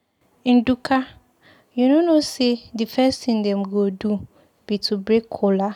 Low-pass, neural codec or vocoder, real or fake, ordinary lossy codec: 19.8 kHz; none; real; none